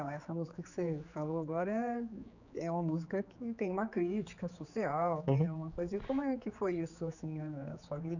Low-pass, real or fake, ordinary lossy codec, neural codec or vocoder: 7.2 kHz; fake; none; codec, 16 kHz, 4 kbps, X-Codec, HuBERT features, trained on general audio